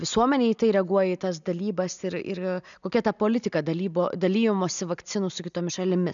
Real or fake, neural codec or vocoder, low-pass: real; none; 7.2 kHz